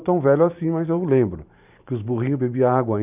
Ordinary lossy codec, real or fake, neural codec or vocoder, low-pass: none; fake; vocoder, 44.1 kHz, 128 mel bands every 512 samples, BigVGAN v2; 3.6 kHz